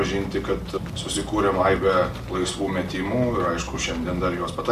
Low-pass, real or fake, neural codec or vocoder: 14.4 kHz; real; none